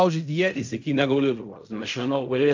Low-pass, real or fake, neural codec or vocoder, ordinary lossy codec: 7.2 kHz; fake; codec, 16 kHz in and 24 kHz out, 0.4 kbps, LongCat-Audio-Codec, fine tuned four codebook decoder; MP3, 64 kbps